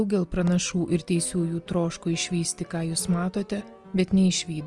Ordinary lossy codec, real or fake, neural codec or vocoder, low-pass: Opus, 24 kbps; real; none; 10.8 kHz